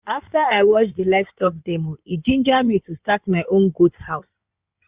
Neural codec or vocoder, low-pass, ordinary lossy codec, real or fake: codec, 16 kHz in and 24 kHz out, 2.2 kbps, FireRedTTS-2 codec; 3.6 kHz; Opus, 64 kbps; fake